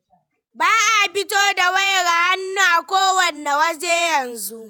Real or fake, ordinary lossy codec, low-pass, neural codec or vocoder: fake; none; 19.8 kHz; vocoder, 48 kHz, 128 mel bands, Vocos